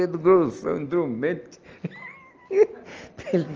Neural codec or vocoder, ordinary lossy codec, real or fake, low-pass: none; Opus, 24 kbps; real; 7.2 kHz